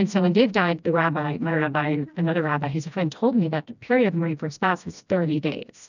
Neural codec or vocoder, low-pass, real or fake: codec, 16 kHz, 1 kbps, FreqCodec, smaller model; 7.2 kHz; fake